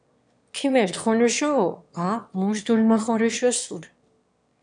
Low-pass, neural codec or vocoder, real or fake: 9.9 kHz; autoencoder, 22.05 kHz, a latent of 192 numbers a frame, VITS, trained on one speaker; fake